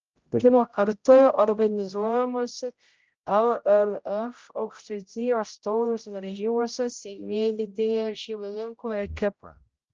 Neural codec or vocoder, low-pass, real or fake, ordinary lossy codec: codec, 16 kHz, 0.5 kbps, X-Codec, HuBERT features, trained on general audio; 7.2 kHz; fake; Opus, 24 kbps